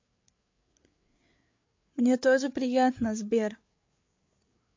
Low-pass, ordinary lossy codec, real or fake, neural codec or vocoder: 7.2 kHz; MP3, 48 kbps; fake; codec, 16 kHz, 8 kbps, FreqCodec, larger model